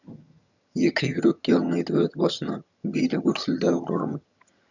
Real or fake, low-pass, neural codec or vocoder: fake; 7.2 kHz; vocoder, 22.05 kHz, 80 mel bands, HiFi-GAN